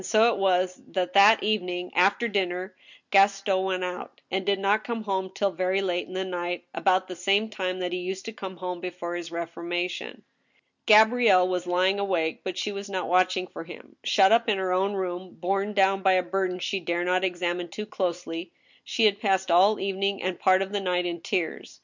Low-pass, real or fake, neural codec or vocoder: 7.2 kHz; real; none